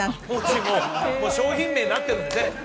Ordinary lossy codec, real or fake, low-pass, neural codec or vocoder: none; real; none; none